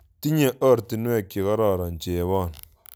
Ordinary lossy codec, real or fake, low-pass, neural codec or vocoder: none; real; none; none